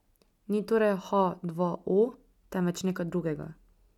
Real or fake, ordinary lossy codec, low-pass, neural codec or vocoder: real; none; 19.8 kHz; none